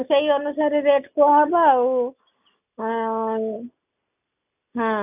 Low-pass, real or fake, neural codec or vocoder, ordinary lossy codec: 3.6 kHz; real; none; none